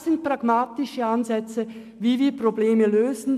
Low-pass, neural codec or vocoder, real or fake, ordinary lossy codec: 14.4 kHz; none; real; MP3, 96 kbps